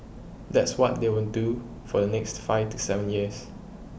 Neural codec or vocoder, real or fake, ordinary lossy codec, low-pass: none; real; none; none